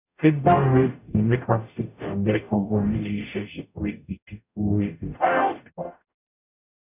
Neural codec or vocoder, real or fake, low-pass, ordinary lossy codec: codec, 44.1 kHz, 0.9 kbps, DAC; fake; 3.6 kHz; none